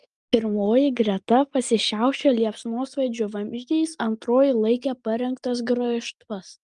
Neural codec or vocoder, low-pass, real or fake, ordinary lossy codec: none; 10.8 kHz; real; Opus, 32 kbps